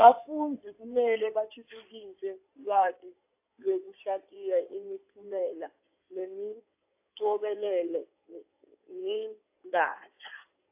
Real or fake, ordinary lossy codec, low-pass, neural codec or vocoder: fake; AAC, 32 kbps; 3.6 kHz; codec, 16 kHz in and 24 kHz out, 2.2 kbps, FireRedTTS-2 codec